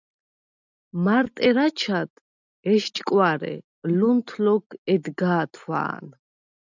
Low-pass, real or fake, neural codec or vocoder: 7.2 kHz; real; none